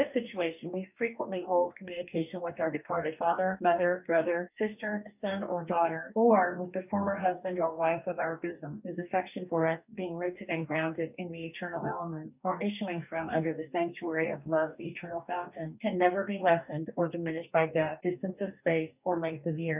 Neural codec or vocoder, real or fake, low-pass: codec, 44.1 kHz, 2.6 kbps, DAC; fake; 3.6 kHz